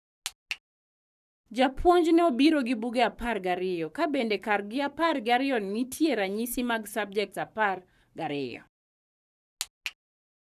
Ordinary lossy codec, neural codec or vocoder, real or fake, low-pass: none; codec, 44.1 kHz, 7.8 kbps, Pupu-Codec; fake; 14.4 kHz